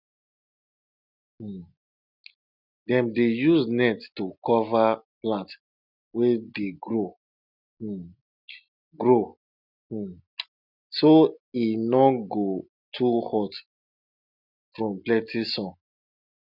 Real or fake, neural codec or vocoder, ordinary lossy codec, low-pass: real; none; none; 5.4 kHz